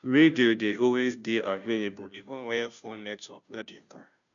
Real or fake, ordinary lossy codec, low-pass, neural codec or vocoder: fake; none; 7.2 kHz; codec, 16 kHz, 0.5 kbps, FunCodec, trained on Chinese and English, 25 frames a second